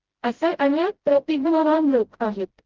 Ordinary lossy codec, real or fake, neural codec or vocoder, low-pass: Opus, 16 kbps; fake; codec, 16 kHz, 0.5 kbps, FreqCodec, smaller model; 7.2 kHz